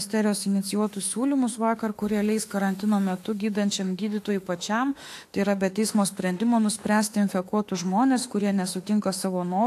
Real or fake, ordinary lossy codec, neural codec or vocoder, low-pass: fake; AAC, 64 kbps; autoencoder, 48 kHz, 32 numbers a frame, DAC-VAE, trained on Japanese speech; 14.4 kHz